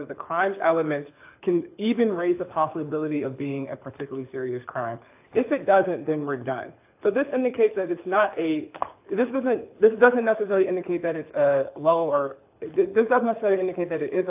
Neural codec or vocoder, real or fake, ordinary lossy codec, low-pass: codec, 24 kHz, 6 kbps, HILCodec; fake; AAC, 32 kbps; 3.6 kHz